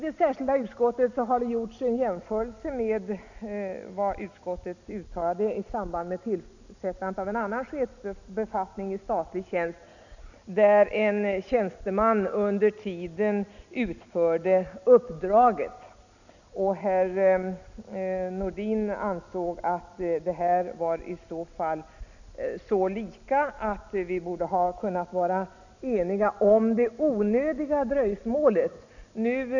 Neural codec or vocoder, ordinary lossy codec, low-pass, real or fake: none; none; 7.2 kHz; real